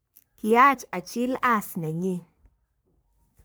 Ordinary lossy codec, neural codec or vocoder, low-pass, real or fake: none; codec, 44.1 kHz, 3.4 kbps, Pupu-Codec; none; fake